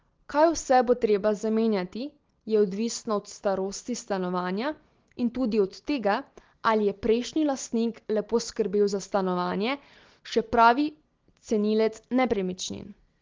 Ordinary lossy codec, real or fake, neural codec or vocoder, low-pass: Opus, 16 kbps; real; none; 7.2 kHz